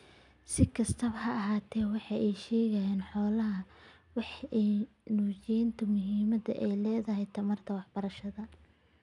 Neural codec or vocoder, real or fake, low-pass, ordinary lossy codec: none; real; 10.8 kHz; none